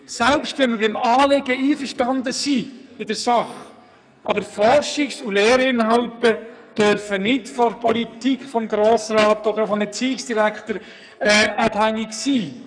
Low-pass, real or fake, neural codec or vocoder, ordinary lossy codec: 9.9 kHz; fake; codec, 44.1 kHz, 2.6 kbps, SNAC; none